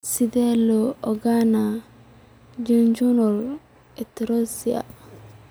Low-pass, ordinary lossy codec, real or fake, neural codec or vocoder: none; none; real; none